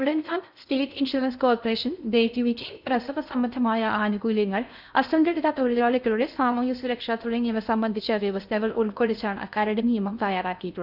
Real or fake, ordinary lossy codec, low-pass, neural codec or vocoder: fake; none; 5.4 kHz; codec, 16 kHz in and 24 kHz out, 0.6 kbps, FocalCodec, streaming, 4096 codes